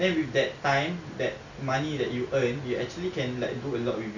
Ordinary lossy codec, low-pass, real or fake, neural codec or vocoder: none; 7.2 kHz; real; none